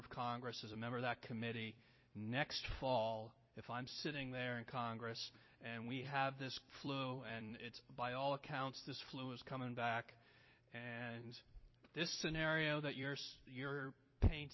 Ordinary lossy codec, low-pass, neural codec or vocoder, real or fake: MP3, 24 kbps; 7.2 kHz; vocoder, 44.1 kHz, 128 mel bands every 256 samples, BigVGAN v2; fake